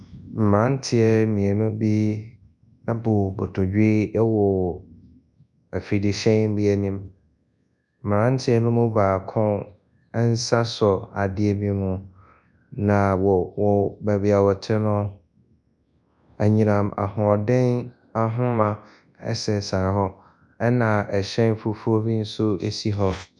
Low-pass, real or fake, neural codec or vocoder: 10.8 kHz; fake; codec, 24 kHz, 0.9 kbps, WavTokenizer, large speech release